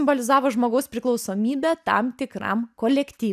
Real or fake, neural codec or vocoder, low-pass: real; none; 14.4 kHz